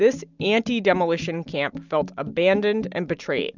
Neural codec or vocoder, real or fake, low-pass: none; real; 7.2 kHz